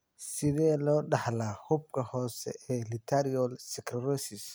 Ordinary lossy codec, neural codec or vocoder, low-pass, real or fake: none; none; none; real